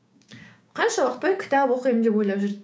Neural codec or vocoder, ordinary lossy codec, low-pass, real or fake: codec, 16 kHz, 6 kbps, DAC; none; none; fake